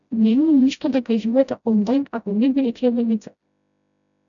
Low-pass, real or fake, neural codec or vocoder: 7.2 kHz; fake; codec, 16 kHz, 0.5 kbps, FreqCodec, smaller model